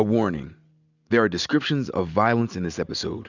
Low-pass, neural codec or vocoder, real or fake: 7.2 kHz; none; real